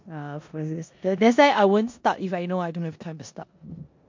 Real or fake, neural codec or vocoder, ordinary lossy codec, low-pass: fake; codec, 16 kHz in and 24 kHz out, 0.9 kbps, LongCat-Audio-Codec, four codebook decoder; MP3, 48 kbps; 7.2 kHz